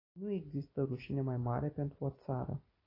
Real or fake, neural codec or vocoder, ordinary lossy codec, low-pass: real; none; AAC, 24 kbps; 5.4 kHz